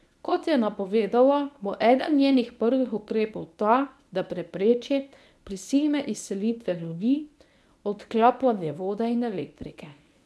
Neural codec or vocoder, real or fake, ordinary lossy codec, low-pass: codec, 24 kHz, 0.9 kbps, WavTokenizer, medium speech release version 1; fake; none; none